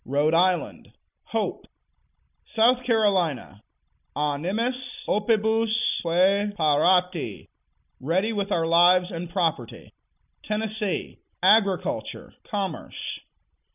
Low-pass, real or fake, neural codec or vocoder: 3.6 kHz; real; none